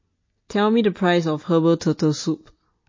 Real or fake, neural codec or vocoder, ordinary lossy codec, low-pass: real; none; MP3, 32 kbps; 7.2 kHz